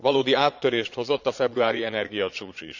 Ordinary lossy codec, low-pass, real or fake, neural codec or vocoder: none; 7.2 kHz; fake; vocoder, 22.05 kHz, 80 mel bands, Vocos